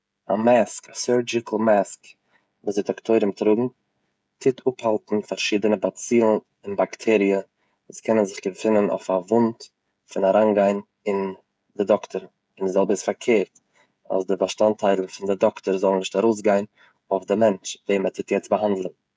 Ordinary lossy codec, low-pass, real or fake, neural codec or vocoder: none; none; fake; codec, 16 kHz, 16 kbps, FreqCodec, smaller model